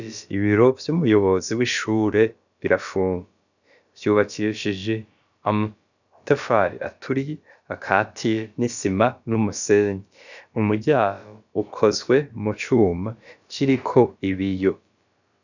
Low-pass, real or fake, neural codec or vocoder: 7.2 kHz; fake; codec, 16 kHz, about 1 kbps, DyCAST, with the encoder's durations